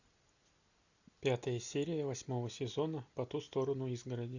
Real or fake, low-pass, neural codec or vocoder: real; 7.2 kHz; none